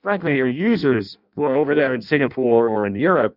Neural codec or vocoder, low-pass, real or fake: codec, 16 kHz in and 24 kHz out, 0.6 kbps, FireRedTTS-2 codec; 5.4 kHz; fake